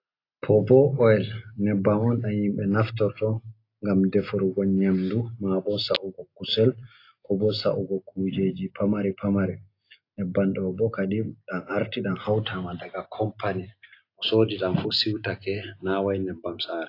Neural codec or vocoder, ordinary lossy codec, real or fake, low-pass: none; AAC, 32 kbps; real; 5.4 kHz